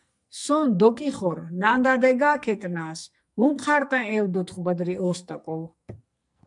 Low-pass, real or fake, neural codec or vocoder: 10.8 kHz; fake; codec, 44.1 kHz, 2.6 kbps, SNAC